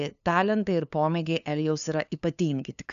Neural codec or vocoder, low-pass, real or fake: codec, 16 kHz, 2 kbps, FunCodec, trained on LibriTTS, 25 frames a second; 7.2 kHz; fake